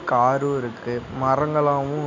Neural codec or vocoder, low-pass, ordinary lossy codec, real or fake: none; 7.2 kHz; MP3, 64 kbps; real